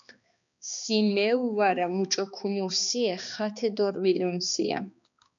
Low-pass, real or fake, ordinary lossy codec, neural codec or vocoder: 7.2 kHz; fake; MP3, 96 kbps; codec, 16 kHz, 2 kbps, X-Codec, HuBERT features, trained on balanced general audio